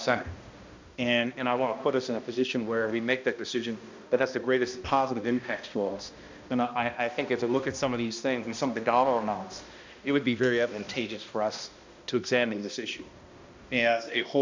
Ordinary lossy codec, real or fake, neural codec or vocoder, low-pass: MP3, 64 kbps; fake; codec, 16 kHz, 1 kbps, X-Codec, HuBERT features, trained on balanced general audio; 7.2 kHz